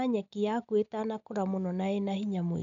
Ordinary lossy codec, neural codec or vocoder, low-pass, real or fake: none; none; 7.2 kHz; real